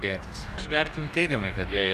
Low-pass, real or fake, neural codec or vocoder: 14.4 kHz; fake; codec, 44.1 kHz, 2.6 kbps, DAC